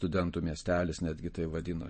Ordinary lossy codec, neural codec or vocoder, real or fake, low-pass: MP3, 32 kbps; none; real; 10.8 kHz